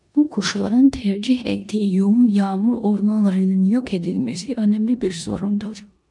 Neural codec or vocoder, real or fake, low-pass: codec, 16 kHz in and 24 kHz out, 0.9 kbps, LongCat-Audio-Codec, four codebook decoder; fake; 10.8 kHz